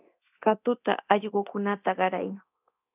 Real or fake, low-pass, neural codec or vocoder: fake; 3.6 kHz; codec, 24 kHz, 0.9 kbps, DualCodec